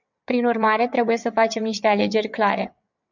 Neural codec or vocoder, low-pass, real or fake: vocoder, 22.05 kHz, 80 mel bands, WaveNeXt; 7.2 kHz; fake